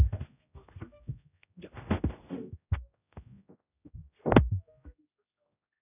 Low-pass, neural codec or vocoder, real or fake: 3.6 kHz; codec, 16 kHz, 0.5 kbps, X-Codec, HuBERT features, trained on general audio; fake